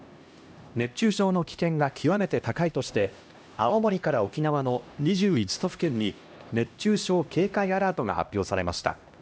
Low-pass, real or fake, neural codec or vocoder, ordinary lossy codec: none; fake; codec, 16 kHz, 1 kbps, X-Codec, HuBERT features, trained on LibriSpeech; none